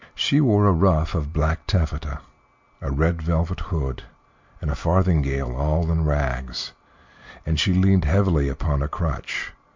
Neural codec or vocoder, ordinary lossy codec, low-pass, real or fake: none; MP3, 64 kbps; 7.2 kHz; real